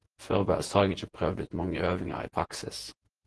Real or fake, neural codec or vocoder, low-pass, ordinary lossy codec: fake; vocoder, 48 kHz, 128 mel bands, Vocos; 10.8 kHz; Opus, 16 kbps